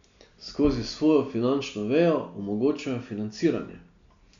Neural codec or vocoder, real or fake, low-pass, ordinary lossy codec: none; real; 7.2 kHz; MP3, 64 kbps